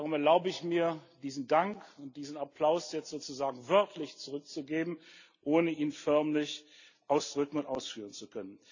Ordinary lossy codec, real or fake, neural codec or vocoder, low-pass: none; real; none; 7.2 kHz